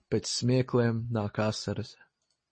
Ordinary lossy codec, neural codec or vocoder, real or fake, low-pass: MP3, 32 kbps; none; real; 9.9 kHz